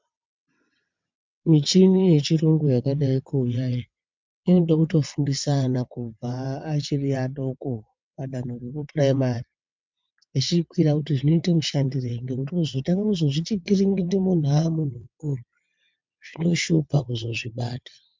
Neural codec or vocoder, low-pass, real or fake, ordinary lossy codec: vocoder, 22.05 kHz, 80 mel bands, WaveNeXt; 7.2 kHz; fake; MP3, 64 kbps